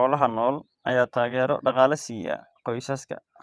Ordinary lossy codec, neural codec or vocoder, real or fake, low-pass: none; vocoder, 22.05 kHz, 80 mel bands, WaveNeXt; fake; none